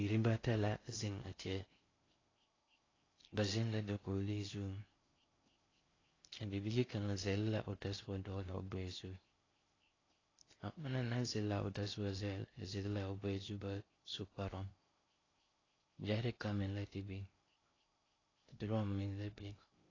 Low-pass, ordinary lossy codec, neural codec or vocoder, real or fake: 7.2 kHz; AAC, 32 kbps; codec, 16 kHz in and 24 kHz out, 0.6 kbps, FocalCodec, streaming, 2048 codes; fake